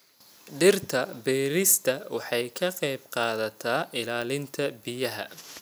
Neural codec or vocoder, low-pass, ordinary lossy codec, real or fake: none; none; none; real